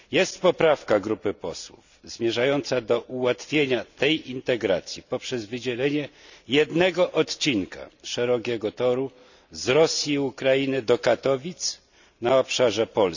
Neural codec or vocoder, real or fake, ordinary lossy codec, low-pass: none; real; none; 7.2 kHz